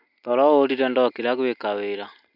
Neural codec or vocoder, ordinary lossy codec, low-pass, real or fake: none; none; 5.4 kHz; real